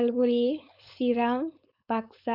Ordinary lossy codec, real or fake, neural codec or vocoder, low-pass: none; fake; codec, 16 kHz, 4.8 kbps, FACodec; 5.4 kHz